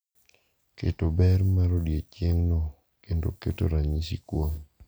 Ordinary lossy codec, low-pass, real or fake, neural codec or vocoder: none; none; real; none